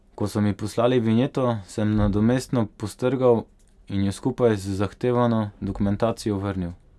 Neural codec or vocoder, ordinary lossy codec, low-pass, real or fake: none; none; none; real